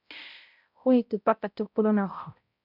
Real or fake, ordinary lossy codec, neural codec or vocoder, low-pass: fake; AAC, 48 kbps; codec, 16 kHz, 0.5 kbps, X-Codec, HuBERT features, trained on balanced general audio; 5.4 kHz